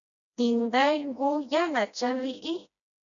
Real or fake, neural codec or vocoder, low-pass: fake; codec, 16 kHz, 1 kbps, FreqCodec, smaller model; 7.2 kHz